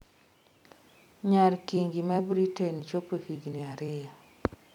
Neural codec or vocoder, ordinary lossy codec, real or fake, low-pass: vocoder, 44.1 kHz, 128 mel bands every 256 samples, BigVGAN v2; none; fake; 19.8 kHz